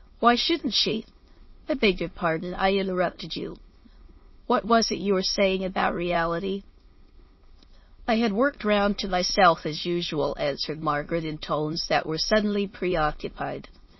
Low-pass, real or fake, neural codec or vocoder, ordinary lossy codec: 7.2 kHz; fake; autoencoder, 22.05 kHz, a latent of 192 numbers a frame, VITS, trained on many speakers; MP3, 24 kbps